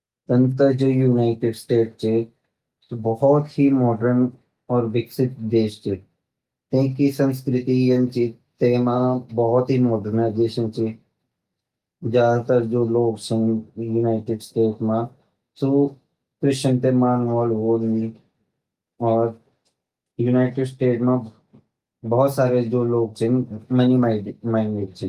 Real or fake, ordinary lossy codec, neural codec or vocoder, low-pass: real; Opus, 32 kbps; none; 14.4 kHz